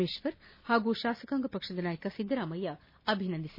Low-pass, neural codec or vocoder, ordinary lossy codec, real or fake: 5.4 kHz; none; MP3, 24 kbps; real